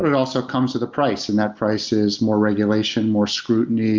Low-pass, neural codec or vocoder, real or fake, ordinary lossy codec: 7.2 kHz; none; real; Opus, 32 kbps